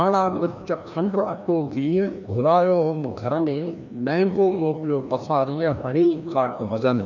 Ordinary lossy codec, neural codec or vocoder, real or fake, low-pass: none; codec, 16 kHz, 1 kbps, FreqCodec, larger model; fake; 7.2 kHz